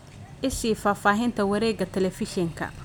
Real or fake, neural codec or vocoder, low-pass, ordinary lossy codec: real; none; none; none